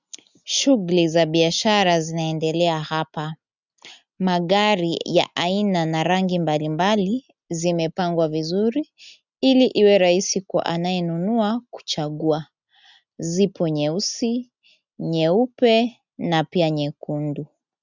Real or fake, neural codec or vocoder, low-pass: real; none; 7.2 kHz